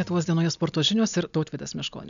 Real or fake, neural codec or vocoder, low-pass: real; none; 7.2 kHz